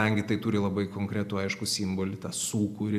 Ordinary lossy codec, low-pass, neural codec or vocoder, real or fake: AAC, 96 kbps; 14.4 kHz; none; real